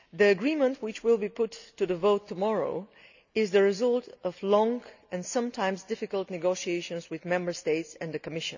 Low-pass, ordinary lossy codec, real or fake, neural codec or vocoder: 7.2 kHz; none; real; none